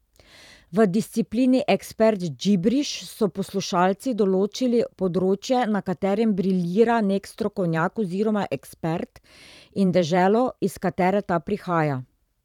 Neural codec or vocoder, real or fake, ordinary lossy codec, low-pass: vocoder, 44.1 kHz, 128 mel bands every 512 samples, BigVGAN v2; fake; none; 19.8 kHz